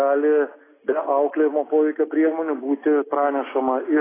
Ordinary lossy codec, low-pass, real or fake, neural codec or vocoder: AAC, 16 kbps; 3.6 kHz; real; none